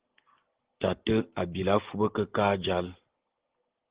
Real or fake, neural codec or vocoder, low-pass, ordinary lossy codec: real; none; 3.6 kHz; Opus, 16 kbps